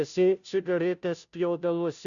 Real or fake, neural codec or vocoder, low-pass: fake; codec, 16 kHz, 0.5 kbps, FunCodec, trained on Chinese and English, 25 frames a second; 7.2 kHz